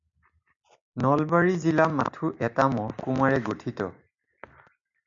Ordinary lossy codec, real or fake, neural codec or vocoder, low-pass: MP3, 64 kbps; real; none; 7.2 kHz